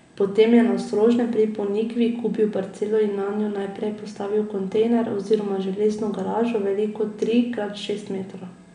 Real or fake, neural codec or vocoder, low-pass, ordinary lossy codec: real; none; 9.9 kHz; none